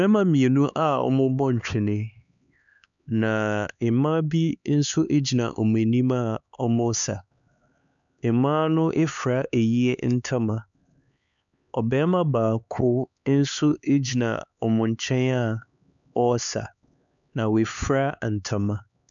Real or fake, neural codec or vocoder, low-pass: fake; codec, 16 kHz, 4 kbps, X-Codec, HuBERT features, trained on LibriSpeech; 7.2 kHz